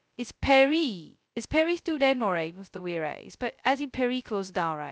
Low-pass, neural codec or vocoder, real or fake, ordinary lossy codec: none; codec, 16 kHz, 0.2 kbps, FocalCodec; fake; none